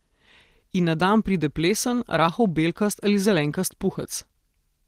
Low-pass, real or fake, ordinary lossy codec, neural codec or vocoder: 14.4 kHz; real; Opus, 16 kbps; none